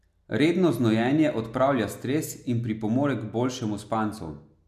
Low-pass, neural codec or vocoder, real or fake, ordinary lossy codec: 14.4 kHz; none; real; none